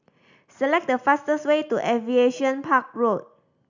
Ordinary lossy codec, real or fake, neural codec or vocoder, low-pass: none; real; none; 7.2 kHz